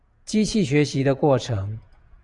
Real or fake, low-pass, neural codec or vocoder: fake; 10.8 kHz; vocoder, 48 kHz, 128 mel bands, Vocos